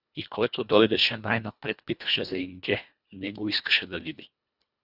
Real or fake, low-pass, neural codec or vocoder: fake; 5.4 kHz; codec, 24 kHz, 1.5 kbps, HILCodec